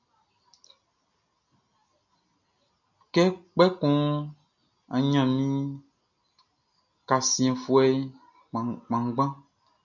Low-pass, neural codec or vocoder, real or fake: 7.2 kHz; none; real